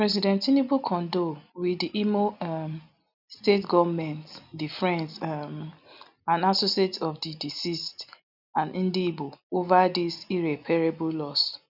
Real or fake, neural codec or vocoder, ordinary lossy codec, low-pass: real; none; none; 5.4 kHz